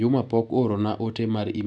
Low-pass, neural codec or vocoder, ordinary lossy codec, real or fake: 9.9 kHz; none; none; real